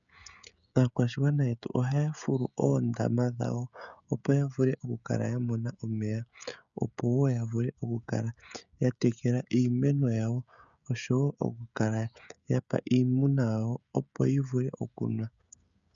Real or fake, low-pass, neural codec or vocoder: fake; 7.2 kHz; codec, 16 kHz, 16 kbps, FreqCodec, smaller model